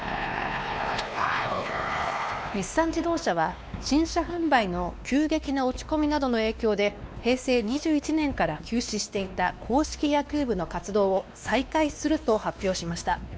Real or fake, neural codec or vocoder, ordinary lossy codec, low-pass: fake; codec, 16 kHz, 2 kbps, X-Codec, WavLM features, trained on Multilingual LibriSpeech; none; none